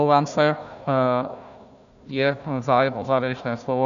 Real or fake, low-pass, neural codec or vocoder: fake; 7.2 kHz; codec, 16 kHz, 1 kbps, FunCodec, trained on Chinese and English, 50 frames a second